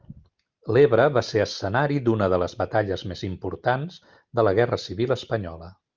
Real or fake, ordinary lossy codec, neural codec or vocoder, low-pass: real; Opus, 24 kbps; none; 7.2 kHz